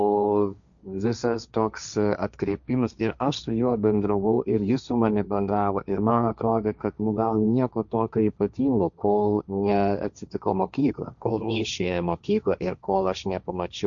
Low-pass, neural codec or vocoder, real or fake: 7.2 kHz; codec, 16 kHz, 1.1 kbps, Voila-Tokenizer; fake